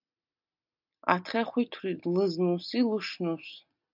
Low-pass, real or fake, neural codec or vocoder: 5.4 kHz; real; none